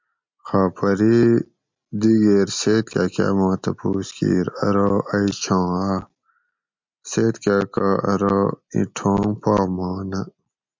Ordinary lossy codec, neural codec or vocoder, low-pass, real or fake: MP3, 64 kbps; none; 7.2 kHz; real